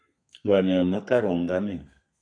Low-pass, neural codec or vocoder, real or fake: 9.9 kHz; codec, 32 kHz, 1.9 kbps, SNAC; fake